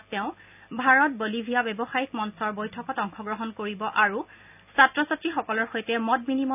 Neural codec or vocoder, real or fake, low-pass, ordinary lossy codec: none; real; 3.6 kHz; none